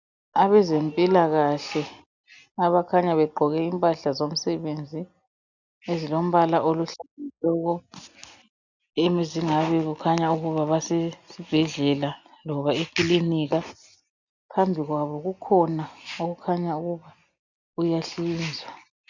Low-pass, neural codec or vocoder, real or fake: 7.2 kHz; none; real